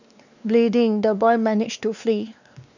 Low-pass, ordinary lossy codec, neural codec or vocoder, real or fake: 7.2 kHz; none; codec, 16 kHz, 2 kbps, X-Codec, WavLM features, trained on Multilingual LibriSpeech; fake